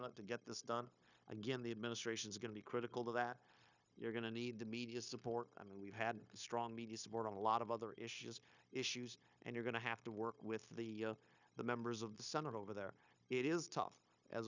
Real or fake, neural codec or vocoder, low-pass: fake; codec, 16 kHz, 0.9 kbps, LongCat-Audio-Codec; 7.2 kHz